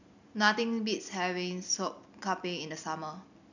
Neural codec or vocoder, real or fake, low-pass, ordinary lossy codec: none; real; 7.2 kHz; none